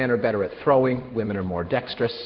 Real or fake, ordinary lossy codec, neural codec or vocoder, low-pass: fake; Opus, 16 kbps; codec, 16 kHz in and 24 kHz out, 1 kbps, XY-Tokenizer; 7.2 kHz